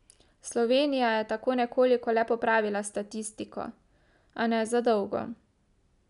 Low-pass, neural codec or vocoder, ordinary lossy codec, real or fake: 10.8 kHz; none; none; real